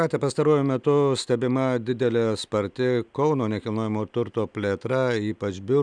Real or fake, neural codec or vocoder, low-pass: real; none; 9.9 kHz